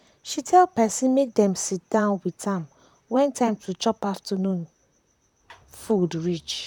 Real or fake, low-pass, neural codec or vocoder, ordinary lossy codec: fake; 19.8 kHz; vocoder, 44.1 kHz, 128 mel bands, Pupu-Vocoder; none